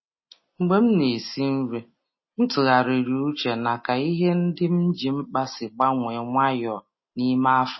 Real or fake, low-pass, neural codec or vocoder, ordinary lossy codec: real; 7.2 kHz; none; MP3, 24 kbps